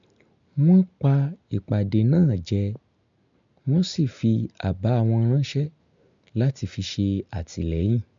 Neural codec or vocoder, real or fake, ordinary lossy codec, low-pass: none; real; MP3, 64 kbps; 7.2 kHz